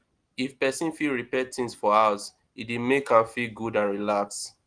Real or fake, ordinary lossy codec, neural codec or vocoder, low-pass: real; Opus, 24 kbps; none; 9.9 kHz